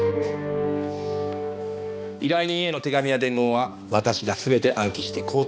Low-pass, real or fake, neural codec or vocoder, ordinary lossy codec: none; fake; codec, 16 kHz, 2 kbps, X-Codec, HuBERT features, trained on balanced general audio; none